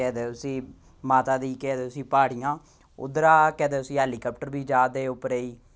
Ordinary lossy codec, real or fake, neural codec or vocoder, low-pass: none; real; none; none